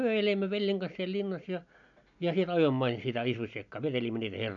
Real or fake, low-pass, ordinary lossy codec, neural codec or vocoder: real; 7.2 kHz; none; none